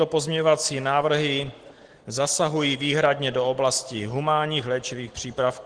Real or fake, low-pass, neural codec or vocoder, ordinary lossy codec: real; 9.9 kHz; none; Opus, 16 kbps